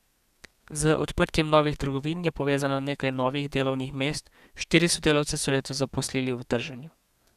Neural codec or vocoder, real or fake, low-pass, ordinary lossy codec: codec, 32 kHz, 1.9 kbps, SNAC; fake; 14.4 kHz; none